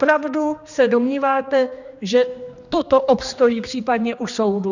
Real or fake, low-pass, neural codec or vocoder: fake; 7.2 kHz; codec, 16 kHz, 2 kbps, X-Codec, HuBERT features, trained on general audio